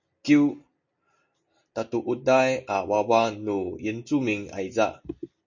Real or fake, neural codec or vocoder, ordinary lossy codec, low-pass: real; none; MP3, 48 kbps; 7.2 kHz